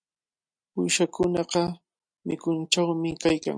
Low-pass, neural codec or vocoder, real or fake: 9.9 kHz; none; real